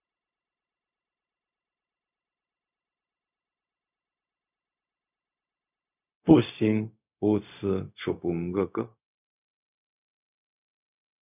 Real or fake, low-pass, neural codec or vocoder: fake; 3.6 kHz; codec, 16 kHz, 0.4 kbps, LongCat-Audio-Codec